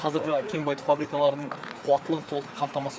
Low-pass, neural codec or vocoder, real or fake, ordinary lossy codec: none; codec, 16 kHz, 4 kbps, FreqCodec, larger model; fake; none